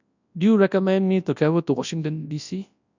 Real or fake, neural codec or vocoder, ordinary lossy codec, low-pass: fake; codec, 24 kHz, 0.9 kbps, WavTokenizer, large speech release; none; 7.2 kHz